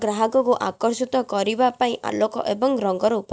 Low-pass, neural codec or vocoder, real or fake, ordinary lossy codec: none; none; real; none